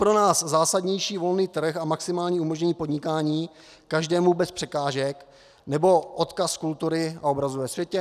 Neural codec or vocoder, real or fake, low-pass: none; real; 14.4 kHz